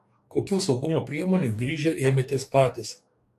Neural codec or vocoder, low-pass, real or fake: codec, 44.1 kHz, 2.6 kbps, DAC; 14.4 kHz; fake